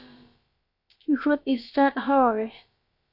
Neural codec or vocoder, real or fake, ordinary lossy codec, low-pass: codec, 16 kHz, about 1 kbps, DyCAST, with the encoder's durations; fake; Opus, 64 kbps; 5.4 kHz